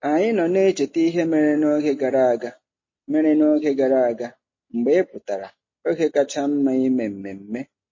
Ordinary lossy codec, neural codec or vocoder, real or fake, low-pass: MP3, 32 kbps; none; real; 7.2 kHz